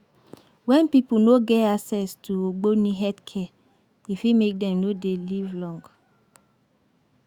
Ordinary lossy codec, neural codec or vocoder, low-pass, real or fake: Opus, 64 kbps; autoencoder, 48 kHz, 128 numbers a frame, DAC-VAE, trained on Japanese speech; 19.8 kHz; fake